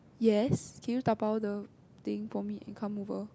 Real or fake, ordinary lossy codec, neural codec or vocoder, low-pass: real; none; none; none